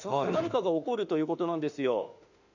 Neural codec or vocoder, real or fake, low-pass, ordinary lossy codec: autoencoder, 48 kHz, 32 numbers a frame, DAC-VAE, trained on Japanese speech; fake; 7.2 kHz; none